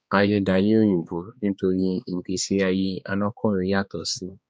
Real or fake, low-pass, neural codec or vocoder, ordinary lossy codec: fake; none; codec, 16 kHz, 2 kbps, X-Codec, HuBERT features, trained on balanced general audio; none